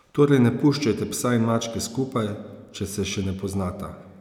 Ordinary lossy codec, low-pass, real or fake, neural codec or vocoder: none; 19.8 kHz; real; none